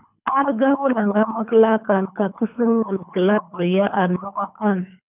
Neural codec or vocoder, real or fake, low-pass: codec, 24 kHz, 3 kbps, HILCodec; fake; 3.6 kHz